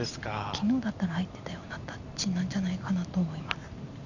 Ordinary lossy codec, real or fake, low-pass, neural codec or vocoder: none; real; 7.2 kHz; none